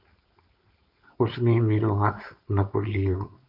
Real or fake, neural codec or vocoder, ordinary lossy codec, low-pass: fake; codec, 16 kHz, 4.8 kbps, FACodec; AAC, 48 kbps; 5.4 kHz